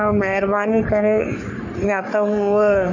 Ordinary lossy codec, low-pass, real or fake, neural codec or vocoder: none; 7.2 kHz; fake; codec, 44.1 kHz, 3.4 kbps, Pupu-Codec